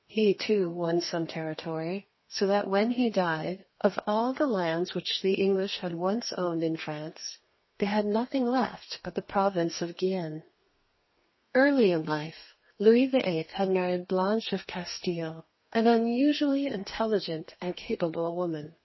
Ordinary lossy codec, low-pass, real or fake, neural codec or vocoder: MP3, 24 kbps; 7.2 kHz; fake; codec, 32 kHz, 1.9 kbps, SNAC